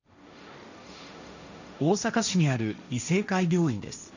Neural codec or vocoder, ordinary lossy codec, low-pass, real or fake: codec, 16 kHz, 1.1 kbps, Voila-Tokenizer; none; 7.2 kHz; fake